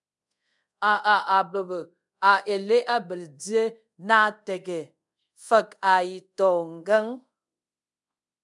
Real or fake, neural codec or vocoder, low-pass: fake; codec, 24 kHz, 0.5 kbps, DualCodec; 10.8 kHz